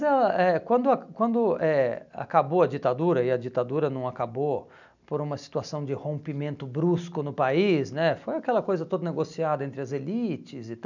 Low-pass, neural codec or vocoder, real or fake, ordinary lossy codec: 7.2 kHz; none; real; none